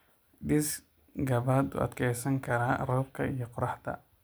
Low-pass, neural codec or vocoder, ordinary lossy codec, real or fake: none; none; none; real